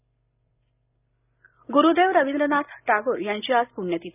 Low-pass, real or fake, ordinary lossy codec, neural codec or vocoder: 3.6 kHz; real; none; none